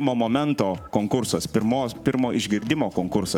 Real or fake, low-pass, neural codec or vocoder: fake; 19.8 kHz; codec, 44.1 kHz, 7.8 kbps, Pupu-Codec